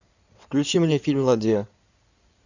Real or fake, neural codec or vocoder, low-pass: fake; codec, 16 kHz in and 24 kHz out, 2.2 kbps, FireRedTTS-2 codec; 7.2 kHz